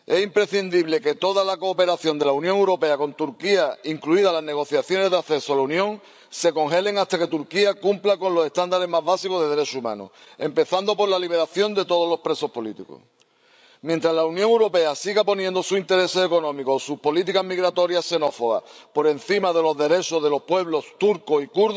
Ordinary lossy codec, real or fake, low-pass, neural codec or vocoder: none; fake; none; codec, 16 kHz, 16 kbps, FreqCodec, larger model